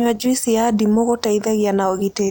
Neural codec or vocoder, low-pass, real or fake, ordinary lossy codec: none; none; real; none